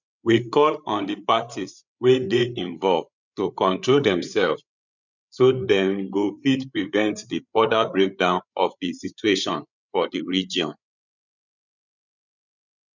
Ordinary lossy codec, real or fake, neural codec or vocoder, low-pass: none; fake; codec, 16 kHz, 8 kbps, FreqCodec, larger model; 7.2 kHz